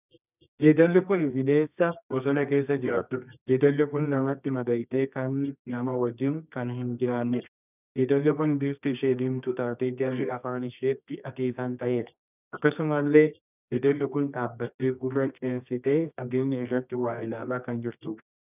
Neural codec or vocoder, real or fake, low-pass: codec, 24 kHz, 0.9 kbps, WavTokenizer, medium music audio release; fake; 3.6 kHz